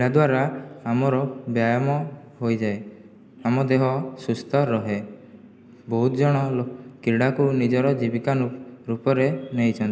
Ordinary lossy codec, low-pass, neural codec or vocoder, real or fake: none; none; none; real